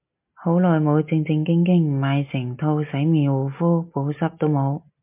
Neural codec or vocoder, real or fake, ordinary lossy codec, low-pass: none; real; MP3, 32 kbps; 3.6 kHz